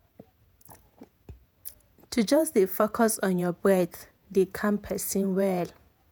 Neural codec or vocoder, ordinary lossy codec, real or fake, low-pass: vocoder, 48 kHz, 128 mel bands, Vocos; none; fake; none